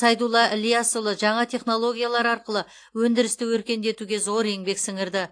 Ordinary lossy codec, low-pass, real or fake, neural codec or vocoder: AAC, 48 kbps; 9.9 kHz; real; none